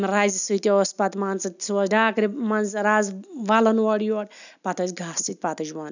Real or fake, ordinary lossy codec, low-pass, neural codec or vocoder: real; none; 7.2 kHz; none